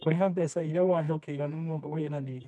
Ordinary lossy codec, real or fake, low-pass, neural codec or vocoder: none; fake; none; codec, 24 kHz, 0.9 kbps, WavTokenizer, medium music audio release